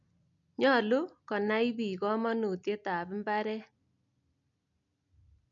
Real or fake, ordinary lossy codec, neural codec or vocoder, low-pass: real; none; none; 7.2 kHz